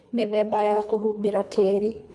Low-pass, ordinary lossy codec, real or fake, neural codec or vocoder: none; none; fake; codec, 24 kHz, 1.5 kbps, HILCodec